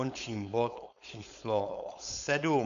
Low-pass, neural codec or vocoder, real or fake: 7.2 kHz; codec, 16 kHz, 4.8 kbps, FACodec; fake